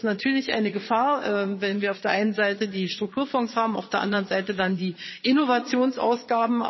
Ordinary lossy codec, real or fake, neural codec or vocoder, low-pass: MP3, 24 kbps; fake; vocoder, 22.05 kHz, 80 mel bands, Vocos; 7.2 kHz